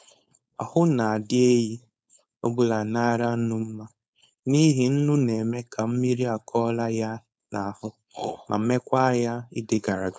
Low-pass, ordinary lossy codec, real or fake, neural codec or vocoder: none; none; fake; codec, 16 kHz, 4.8 kbps, FACodec